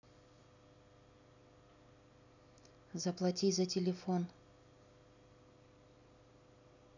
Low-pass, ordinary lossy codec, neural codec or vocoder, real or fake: 7.2 kHz; none; none; real